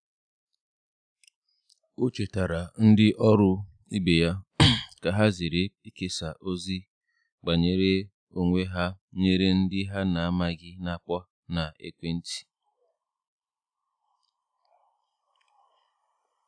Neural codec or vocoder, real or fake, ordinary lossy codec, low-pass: none; real; none; 9.9 kHz